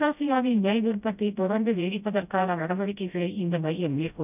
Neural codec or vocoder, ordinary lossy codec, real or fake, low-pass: codec, 16 kHz, 0.5 kbps, FreqCodec, smaller model; none; fake; 3.6 kHz